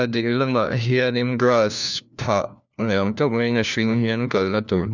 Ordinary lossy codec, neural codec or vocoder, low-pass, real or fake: none; codec, 16 kHz, 1 kbps, FunCodec, trained on LibriTTS, 50 frames a second; 7.2 kHz; fake